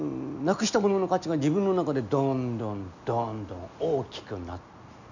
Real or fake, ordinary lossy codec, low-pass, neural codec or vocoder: real; none; 7.2 kHz; none